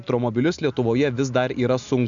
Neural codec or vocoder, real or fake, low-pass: none; real; 7.2 kHz